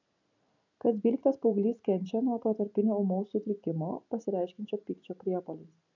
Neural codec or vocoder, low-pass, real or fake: none; 7.2 kHz; real